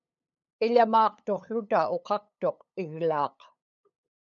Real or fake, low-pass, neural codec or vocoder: fake; 7.2 kHz; codec, 16 kHz, 8 kbps, FunCodec, trained on LibriTTS, 25 frames a second